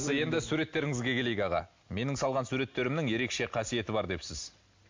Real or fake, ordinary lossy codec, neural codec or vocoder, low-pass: real; MP3, 48 kbps; none; 7.2 kHz